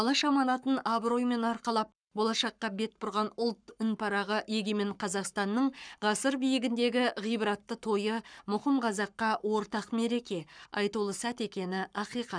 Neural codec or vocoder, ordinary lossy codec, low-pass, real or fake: codec, 44.1 kHz, 7.8 kbps, Pupu-Codec; none; 9.9 kHz; fake